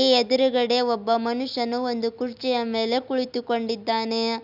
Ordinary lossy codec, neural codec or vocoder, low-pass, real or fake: none; none; 5.4 kHz; real